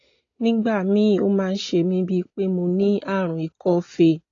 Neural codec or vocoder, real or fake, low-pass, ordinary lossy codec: none; real; 7.2 kHz; AAC, 32 kbps